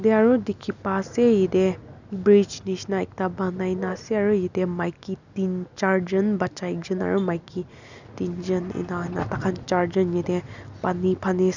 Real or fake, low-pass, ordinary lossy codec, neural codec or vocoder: real; 7.2 kHz; none; none